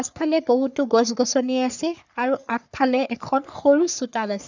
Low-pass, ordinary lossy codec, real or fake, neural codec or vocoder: 7.2 kHz; none; fake; codec, 44.1 kHz, 3.4 kbps, Pupu-Codec